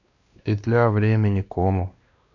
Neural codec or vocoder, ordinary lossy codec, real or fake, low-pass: codec, 16 kHz, 2 kbps, X-Codec, WavLM features, trained on Multilingual LibriSpeech; none; fake; 7.2 kHz